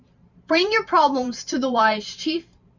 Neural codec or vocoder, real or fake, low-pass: vocoder, 44.1 kHz, 128 mel bands every 512 samples, BigVGAN v2; fake; 7.2 kHz